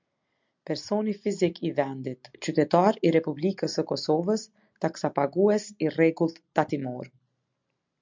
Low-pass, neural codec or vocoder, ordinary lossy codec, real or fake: 7.2 kHz; none; MP3, 64 kbps; real